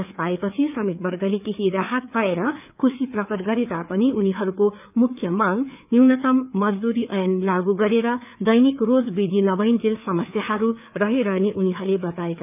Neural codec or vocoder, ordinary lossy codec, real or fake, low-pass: codec, 16 kHz, 4 kbps, FreqCodec, larger model; none; fake; 3.6 kHz